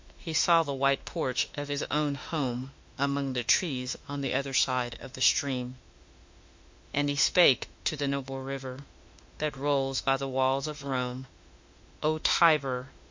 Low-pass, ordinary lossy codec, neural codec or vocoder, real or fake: 7.2 kHz; MP3, 48 kbps; autoencoder, 48 kHz, 32 numbers a frame, DAC-VAE, trained on Japanese speech; fake